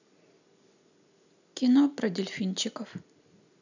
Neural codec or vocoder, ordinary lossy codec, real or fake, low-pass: none; none; real; 7.2 kHz